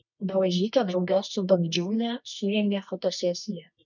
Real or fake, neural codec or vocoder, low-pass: fake; codec, 24 kHz, 0.9 kbps, WavTokenizer, medium music audio release; 7.2 kHz